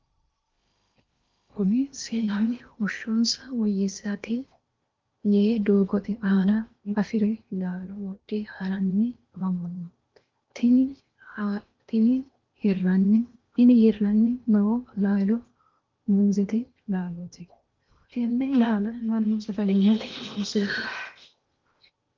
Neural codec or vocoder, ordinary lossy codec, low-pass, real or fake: codec, 16 kHz in and 24 kHz out, 0.8 kbps, FocalCodec, streaming, 65536 codes; Opus, 24 kbps; 7.2 kHz; fake